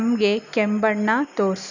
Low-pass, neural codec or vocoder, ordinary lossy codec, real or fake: 7.2 kHz; none; none; real